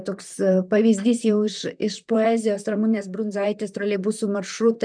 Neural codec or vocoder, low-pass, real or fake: vocoder, 44.1 kHz, 128 mel bands, Pupu-Vocoder; 9.9 kHz; fake